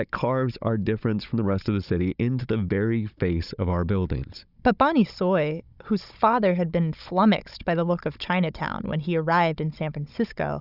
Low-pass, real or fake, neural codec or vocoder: 5.4 kHz; fake; codec, 16 kHz, 16 kbps, FunCodec, trained on Chinese and English, 50 frames a second